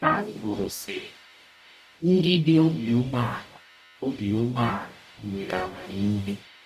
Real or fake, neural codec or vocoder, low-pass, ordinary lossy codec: fake; codec, 44.1 kHz, 0.9 kbps, DAC; 14.4 kHz; none